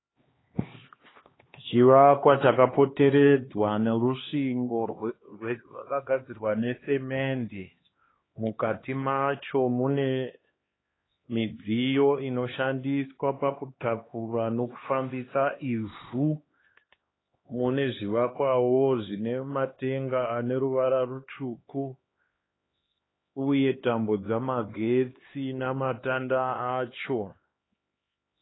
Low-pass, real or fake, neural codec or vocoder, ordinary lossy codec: 7.2 kHz; fake; codec, 16 kHz, 2 kbps, X-Codec, HuBERT features, trained on LibriSpeech; AAC, 16 kbps